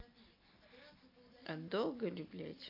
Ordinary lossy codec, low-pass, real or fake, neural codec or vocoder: AAC, 32 kbps; 5.4 kHz; fake; vocoder, 44.1 kHz, 128 mel bands every 256 samples, BigVGAN v2